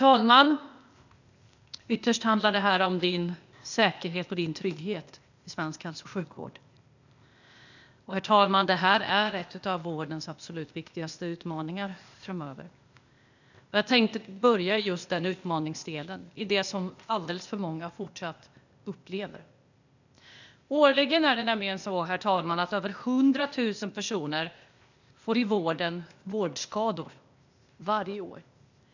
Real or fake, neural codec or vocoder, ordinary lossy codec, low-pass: fake; codec, 16 kHz, 0.8 kbps, ZipCodec; none; 7.2 kHz